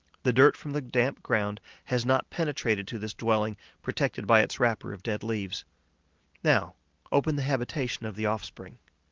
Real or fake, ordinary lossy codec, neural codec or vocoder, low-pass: real; Opus, 32 kbps; none; 7.2 kHz